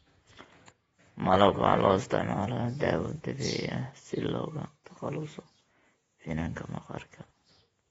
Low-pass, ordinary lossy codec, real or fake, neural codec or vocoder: 19.8 kHz; AAC, 24 kbps; fake; vocoder, 48 kHz, 128 mel bands, Vocos